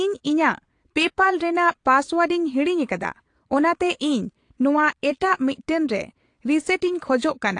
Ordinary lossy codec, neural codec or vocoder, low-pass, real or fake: AAC, 48 kbps; vocoder, 22.05 kHz, 80 mel bands, Vocos; 9.9 kHz; fake